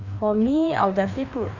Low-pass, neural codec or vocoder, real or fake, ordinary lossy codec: 7.2 kHz; codec, 16 kHz, 2 kbps, FreqCodec, larger model; fake; none